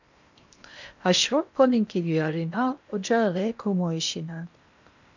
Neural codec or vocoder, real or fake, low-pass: codec, 16 kHz in and 24 kHz out, 0.8 kbps, FocalCodec, streaming, 65536 codes; fake; 7.2 kHz